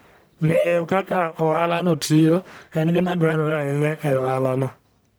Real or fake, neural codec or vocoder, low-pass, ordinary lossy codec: fake; codec, 44.1 kHz, 1.7 kbps, Pupu-Codec; none; none